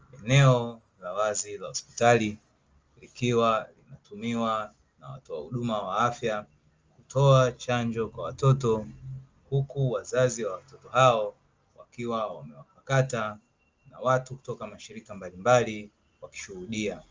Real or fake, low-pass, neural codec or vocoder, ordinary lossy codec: real; 7.2 kHz; none; Opus, 32 kbps